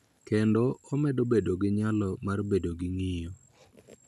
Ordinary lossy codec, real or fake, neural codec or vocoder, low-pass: none; real; none; 14.4 kHz